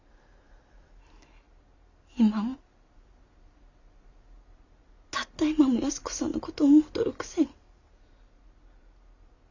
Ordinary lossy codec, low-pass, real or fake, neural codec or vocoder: none; 7.2 kHz; real; none